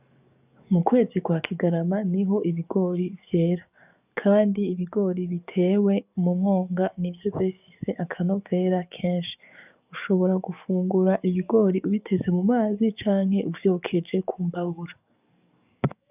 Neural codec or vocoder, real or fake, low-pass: codec, 44.1 kHz, 7.8 kbps, DAC; fake; 3.6 kHz